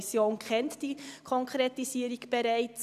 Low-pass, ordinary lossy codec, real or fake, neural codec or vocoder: 14.4 kHz; none; fake; vocoder, 44.1 kHz, 128 mel bands every 256 samples, BigVGAN v2